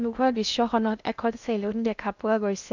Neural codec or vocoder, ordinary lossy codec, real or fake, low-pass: codec, 16 kHz in and 24 kHz out, 0.6 kbps, FocalCodec, streaming, 2048 codes; none; fake; 7.2 kHz